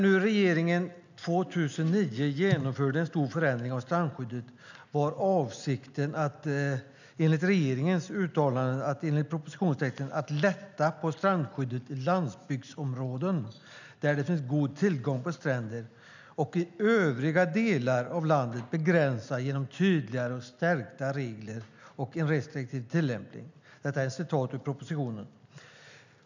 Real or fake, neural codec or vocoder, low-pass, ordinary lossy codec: real; none; 7.2 kHz; none